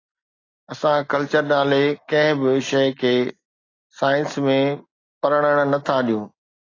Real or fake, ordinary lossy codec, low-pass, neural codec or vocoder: real; AAC, 32 kbps; 7.2 kHz; none